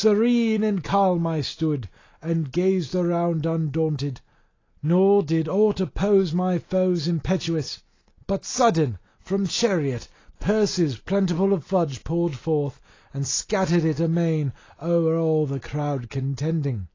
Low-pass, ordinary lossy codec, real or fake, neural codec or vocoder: 7.2 kHz; AAC, 32 kbps; real; none